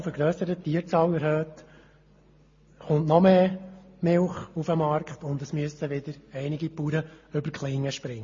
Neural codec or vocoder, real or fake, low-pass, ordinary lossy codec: none; real; 7.2 kHz; MP3, 32 kbps